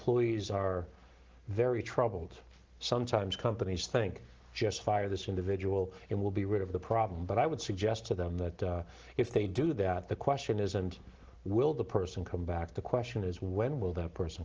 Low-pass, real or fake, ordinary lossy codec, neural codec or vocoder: 7.2 kHz; real; Opus, 16 kbps; none